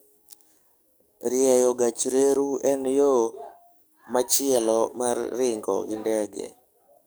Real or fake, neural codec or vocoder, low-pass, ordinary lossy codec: fake; codec, 44.1 kHz, 7.8 kbps, DAC; none; none